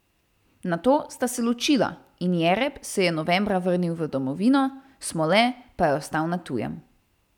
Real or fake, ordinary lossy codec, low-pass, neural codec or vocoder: real; none; 19.8 kHz; none